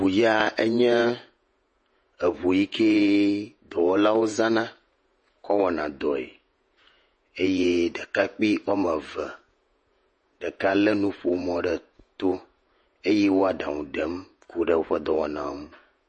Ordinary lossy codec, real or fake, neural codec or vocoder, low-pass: MP3, 32 kbps; fake; vocoder, 48 kHz, 128 mel bands, Vocos; 10.8 kHz